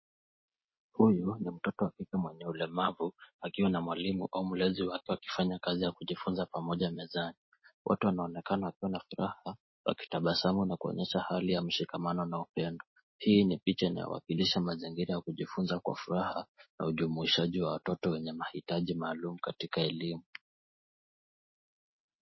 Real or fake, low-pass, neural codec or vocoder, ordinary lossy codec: real; 7.2 kHz; none; MP3, 24 kbps